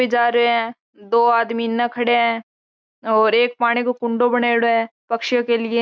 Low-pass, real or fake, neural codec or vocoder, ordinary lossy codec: none; real; none; none